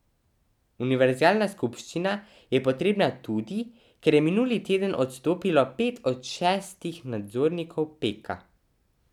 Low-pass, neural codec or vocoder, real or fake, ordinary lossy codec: 19.8 kHz; none; real; none